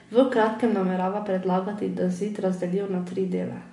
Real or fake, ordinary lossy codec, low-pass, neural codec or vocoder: real; MP3, 48 kbps; 10.8 kHz; none